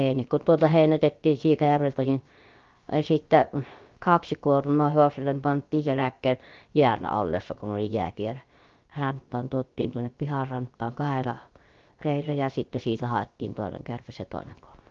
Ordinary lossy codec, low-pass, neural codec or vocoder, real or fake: Opus, 32 kbps; 7.2 kHz; codec, 16 kHz, 0.7 kbps, FocalCodec; fake